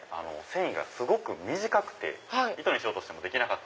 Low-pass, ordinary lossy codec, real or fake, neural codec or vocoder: none; none; real; none